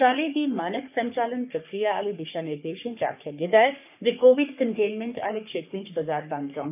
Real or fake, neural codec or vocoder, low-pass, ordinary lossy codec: fake; codec, 44.1 kHz, 3.4 kbps, Pupu-Codec; 3.6 kHz; none